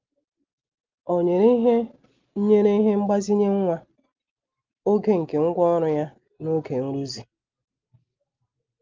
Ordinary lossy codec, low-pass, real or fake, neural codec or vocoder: Opus, 32 kbps; 7.2 kHz; real; none